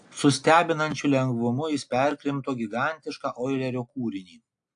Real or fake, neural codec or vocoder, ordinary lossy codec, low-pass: real; none; AAC, 64 kbps; 9.9 kHz